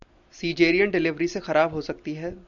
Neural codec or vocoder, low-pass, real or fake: none; 7.2 kHz; real